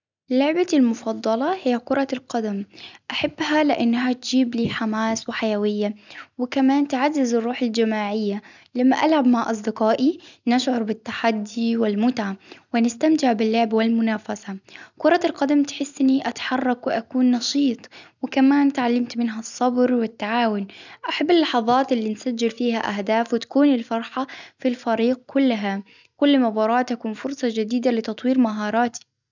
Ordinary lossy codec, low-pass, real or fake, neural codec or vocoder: none; 7.2 kHz; real; none